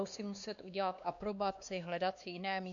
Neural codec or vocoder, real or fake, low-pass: codec, 16 kHz, 2 kbps, X-Codec, WavLM features, trained on Multilingual LibriSpeech; fake; 7.2 kHz